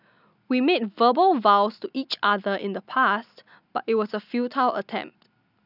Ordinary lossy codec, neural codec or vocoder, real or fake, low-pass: none; none; real; 5.4 kHz